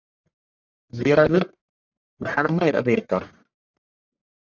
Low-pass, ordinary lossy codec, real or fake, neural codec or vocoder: 7.2 kHz; MP3, 64 kbps; fake; codec, 44.1 kHz, 1.7 kbps, Pupu-Codec